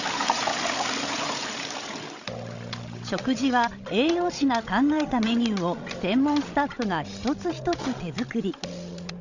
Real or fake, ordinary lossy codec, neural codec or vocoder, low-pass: fake; none; codec, 16 kHz, 16 kbps, FreqCodec, larger model; 7.2 kHz